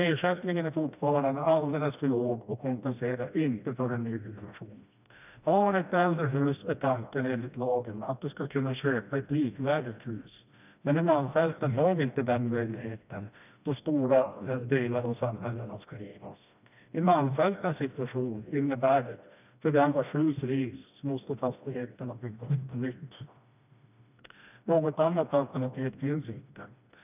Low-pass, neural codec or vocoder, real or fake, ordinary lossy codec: 3.6 kHz; codec, 16 kHz, 1 kbps, FreqCodec, smaller model; fake; none